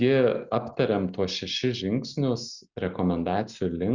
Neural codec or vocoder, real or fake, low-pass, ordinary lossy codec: none; real; 7.2 kHz; Opus, 64 kbps